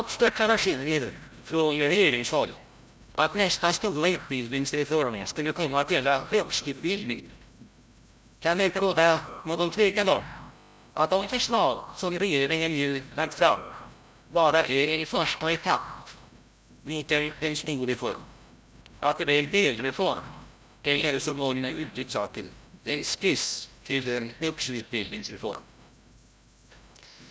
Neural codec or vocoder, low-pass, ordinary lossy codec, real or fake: codec, 16 kHz, 0.5 kbps, FreqCodec, larger model; none; none; fake